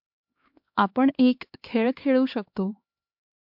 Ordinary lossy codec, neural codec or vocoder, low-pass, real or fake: MP3, 48 kbps; codec, 16 kHz, 2 kbps, X-Codec, HuBERT features, trained on LibriSpeech; 5.4 kHz; fake